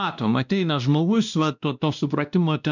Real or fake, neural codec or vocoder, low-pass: fake; codec, 16 kHz, 1 kbps, X-Codec, WavLM features, trained on Multilingual LibriSpeech; 7.2 kHz